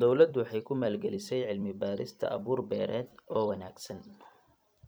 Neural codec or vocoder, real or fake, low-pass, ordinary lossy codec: vocoder, 44.1 kHz, 128 mel bands every 256 samples, BigVGAN v2; fake; none; none